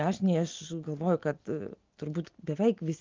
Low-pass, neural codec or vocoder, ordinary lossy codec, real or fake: 7.2 kHz; none; Opus, 32 kbps; real